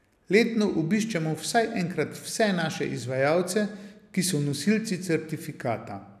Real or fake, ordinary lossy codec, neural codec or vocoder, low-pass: real; none; none; 14.4 kHz